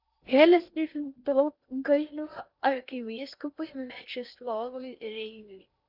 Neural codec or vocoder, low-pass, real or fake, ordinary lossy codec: codec, 16 kHz in and 24 kHz out, 0.8 kbps, FocalCodec, streaming, 65536 codes; 5.4 kHz; fake; Opus, 64 kbps